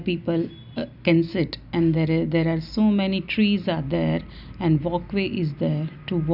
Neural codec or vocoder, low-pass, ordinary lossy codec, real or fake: none; 5.4 kHz; none; real